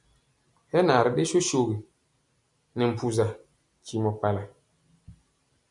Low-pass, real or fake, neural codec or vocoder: 10.8 kHz; real; none